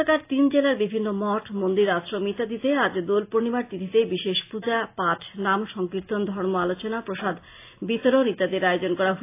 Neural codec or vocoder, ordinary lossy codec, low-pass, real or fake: none; AAC, 24 kbps; 3.6 kHz; real